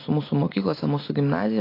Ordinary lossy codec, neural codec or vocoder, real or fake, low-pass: AAC, 32 kbps; none; real; 5.4 kHz